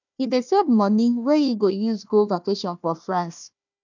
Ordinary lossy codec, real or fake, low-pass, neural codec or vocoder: none; fake; 7.2 kHz; codec, 16 kHz, 1 kbps, FunCodec, trained on Chinese and English, 50 frames a second